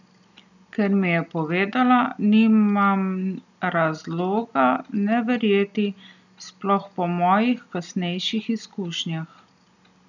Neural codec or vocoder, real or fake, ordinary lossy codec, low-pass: none; real; none; 7.2 kHz